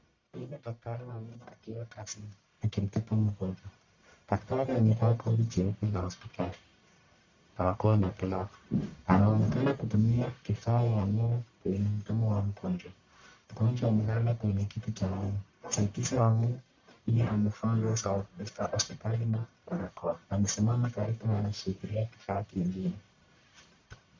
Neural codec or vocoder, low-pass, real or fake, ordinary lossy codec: codec, 44.1 kHz, 1.7 kbps, Pupu-Codec; 7.2 kHz; fake; MP3, 64 kbps